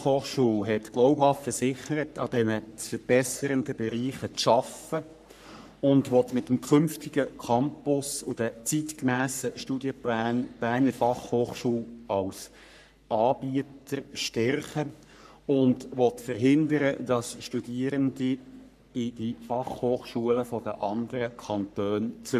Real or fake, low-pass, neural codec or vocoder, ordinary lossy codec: fake; 14.4 kHz; codec, 44.1 kHz, 3.4 kbps, Pupu-Codec; none